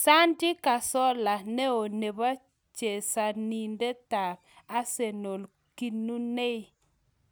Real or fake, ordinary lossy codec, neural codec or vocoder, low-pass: real; none; none; none